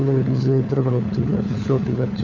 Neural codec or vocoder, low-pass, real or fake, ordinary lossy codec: codec, 16 kHz, 4 kbps, FunCodec, trained on LibriTTS, 50 frames a second; 7.2 kHz; fake; none